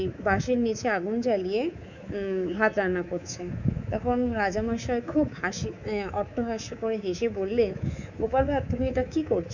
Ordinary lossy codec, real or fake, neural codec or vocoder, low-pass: none; fake; codec, 24 kHz, 3.1 kbps, DualCodec; 7.2 kHz